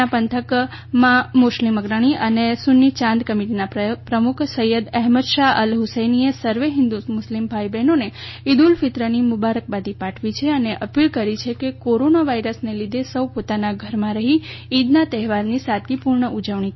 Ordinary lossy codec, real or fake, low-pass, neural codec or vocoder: MP3, 24 kbps; real; 7.2 kHz; none